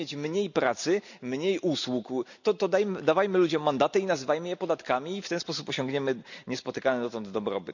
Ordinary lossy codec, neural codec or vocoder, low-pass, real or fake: none; none; 7.2 kHz; real